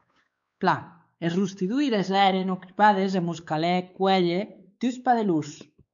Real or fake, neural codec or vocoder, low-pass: fake; codec, 16 kHz, 4 kbps, X-Codec, WavLM features, trained on Multilingual LibriSpeech; 7.2 kHz